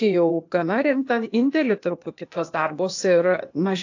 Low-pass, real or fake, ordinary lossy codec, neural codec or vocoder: 7.2 kHz; fake; AAC, 48 kbps; codec, 16 kHz, 0.8 kbps, ZipCodec